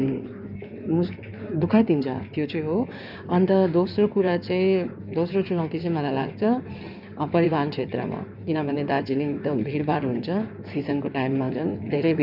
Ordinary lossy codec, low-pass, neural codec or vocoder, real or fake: none; 5.4 kHz; codec, 16 kHz in and 24 kHz out, 2.2 kbps, FireRedTTS-2 codec; fake